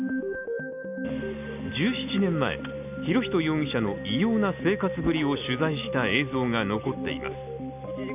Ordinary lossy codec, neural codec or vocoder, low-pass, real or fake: none; none; 3.6 kHz; real